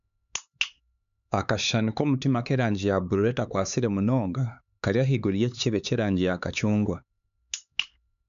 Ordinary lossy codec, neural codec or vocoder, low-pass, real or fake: none; codec, 16 kHz, 4 kbps, X-Codec, HuBERT features, trained on LibriSpeech; 7.2 kHz; fake